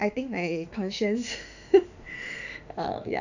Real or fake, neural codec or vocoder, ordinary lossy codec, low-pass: fake; autoencoder, 48 kHz, 32 numbers a frame, DAC-VAE, trained on Japanese speech; none; 7.2 kHz